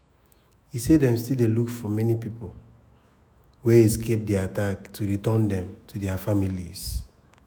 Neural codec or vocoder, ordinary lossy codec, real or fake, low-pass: autoencoder, 48 kHz, 128 numbers a frame, DAC-VAE, trained on Japanese speech; none; fake; none